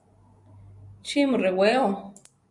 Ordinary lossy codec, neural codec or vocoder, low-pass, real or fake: Opus, 64 kbps; none; 10.8 kHz; real